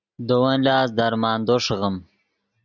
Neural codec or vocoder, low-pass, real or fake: none; 7.2 kHz; real